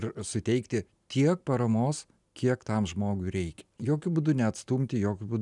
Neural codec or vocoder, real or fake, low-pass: none; real; 10.8 kHz